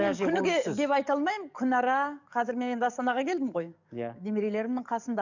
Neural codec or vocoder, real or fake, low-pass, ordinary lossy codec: codec, 44.1 kHz, 7.8 kbps, DAC; fake; 7.2 kHz; none